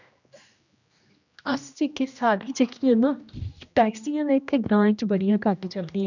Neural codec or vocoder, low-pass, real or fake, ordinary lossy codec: codec, 16 kHz, 1 kbps, X-Codec, HuBERT features, trained on general audio; 7.2 kHz; fake; none